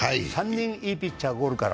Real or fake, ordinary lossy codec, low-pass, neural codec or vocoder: real; none; none; none